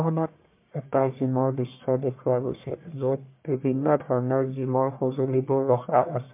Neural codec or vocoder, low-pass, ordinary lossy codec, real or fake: codec, 44.1 kHz, 1.7 kbps, Pupu-Codec; 3.6 kHz; MP3, 24 kbps; fake